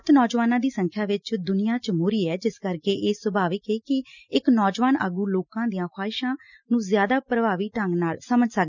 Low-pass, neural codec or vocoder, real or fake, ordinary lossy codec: 7.2 kHz; none; real; none